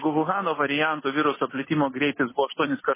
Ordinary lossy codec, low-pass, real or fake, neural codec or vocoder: MP3, 16 kbps; 3.6 kHz; real; none